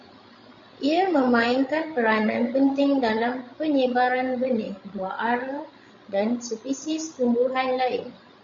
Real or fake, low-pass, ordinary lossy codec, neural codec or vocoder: fake; 7.2 kHz; MP3, 48 kbps; codec, 16 kHz, 16 kbps, FreqCodec, larger model